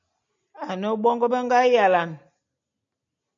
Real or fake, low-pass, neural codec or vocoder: real; 7.2 kHz; none